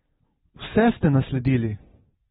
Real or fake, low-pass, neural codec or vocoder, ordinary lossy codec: real; 19.8 kHz; none; AAC, 16 kbps